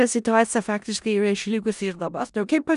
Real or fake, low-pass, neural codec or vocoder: fake; 10.8 kHz; codec, 16 kHz in and 24 kHz out, 0.4 kbps, LongCat-Audio-Codec, four codebook decoder